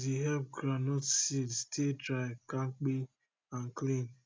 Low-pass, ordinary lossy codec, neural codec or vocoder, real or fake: none; none; none; real